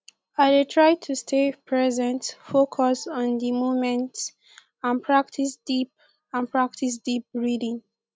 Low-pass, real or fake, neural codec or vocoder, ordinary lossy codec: none; real; none; none